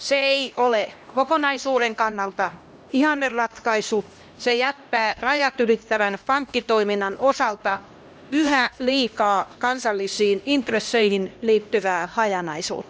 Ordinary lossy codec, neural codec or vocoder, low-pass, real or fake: none; codec, 16 kHz, 1 kbps, X-Codec, HuBERT features, trained on LibriSpeech; none; fake